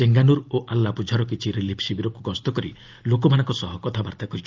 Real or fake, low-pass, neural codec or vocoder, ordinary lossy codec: real; 7.2 kHz; none; Opus, 24 kbps